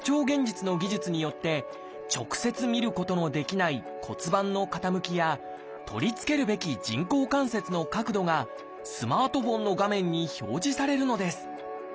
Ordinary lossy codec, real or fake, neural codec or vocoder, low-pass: none; real; none; none